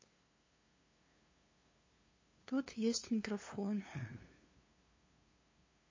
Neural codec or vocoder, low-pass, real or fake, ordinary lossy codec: codec, 16 kHz, 2 kbps, FunCodec, trained on LibriTTS, 25 frames a second; 7.2 kHz; fake; MP3, 32 kbps